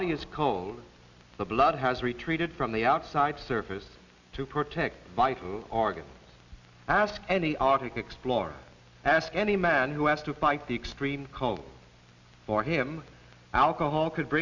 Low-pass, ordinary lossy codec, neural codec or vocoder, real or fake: 7.2 kHz; Opus, 64 kbps; none; real